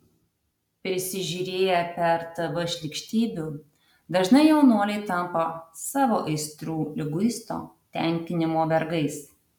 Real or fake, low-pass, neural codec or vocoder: real; 19.8 kHz; none